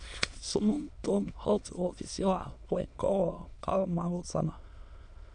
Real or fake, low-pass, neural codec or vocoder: fake; 9.9 kHz; autoencoder, 22.05 kHz, a latent of 192 numbers a frame, VITS, trained on many speakers